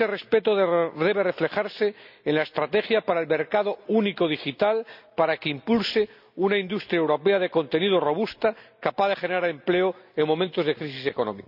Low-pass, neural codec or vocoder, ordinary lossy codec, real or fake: 5.4 kHz; none; none; real